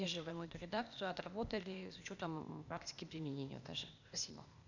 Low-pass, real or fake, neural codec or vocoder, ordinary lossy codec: 7.2 kHz; fake; codec, 16 kHz, 0.8 kbps, ZipCodec; none